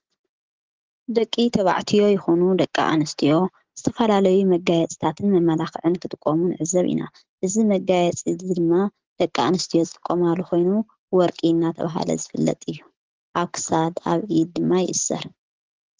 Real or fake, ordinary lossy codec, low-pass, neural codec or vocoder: real; Opus, 16 kbps; 7.2 kHz; none